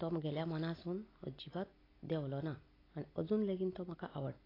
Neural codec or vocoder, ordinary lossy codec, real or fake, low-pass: none; AAC, 24 kbps; real; 5.4 kHz